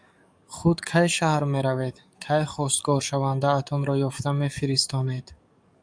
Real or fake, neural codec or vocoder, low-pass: fake; codec, 44.1 kHz, 7.8 kbps, DAC; 9.9 kHz